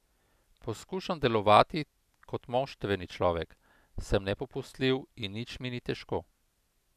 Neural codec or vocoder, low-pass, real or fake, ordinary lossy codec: none; 14.4 kHz; real; none